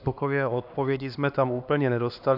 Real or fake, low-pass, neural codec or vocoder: fake; 5.4 kHz; codec, 16 kHz, 2 kbps, X-Codec, HuBERT features, trained on LibriSpeech